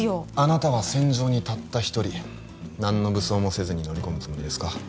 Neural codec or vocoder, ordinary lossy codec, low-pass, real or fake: none; none; none; real